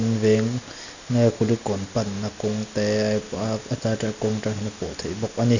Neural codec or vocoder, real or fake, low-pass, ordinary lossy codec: none; real; 7.2 kHz; none